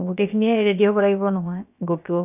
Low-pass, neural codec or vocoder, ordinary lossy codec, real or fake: 3.6 kHz; codec, 16 kHz, about 1 kbps, DyCAST, with the encoder's durations; none; fake